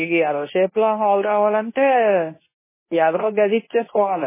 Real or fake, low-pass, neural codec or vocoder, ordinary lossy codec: fake; 3.6 kHz; codec, 24 kHz, 0.9 kbps, WavTokenizer, medium speech release version 2; MP3, 16 kbps